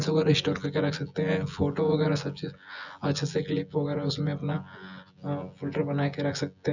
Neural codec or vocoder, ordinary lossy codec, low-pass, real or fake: vocoder, 24 kHz, 100 mel bands, Vocos; none; 7.2 kHz; fake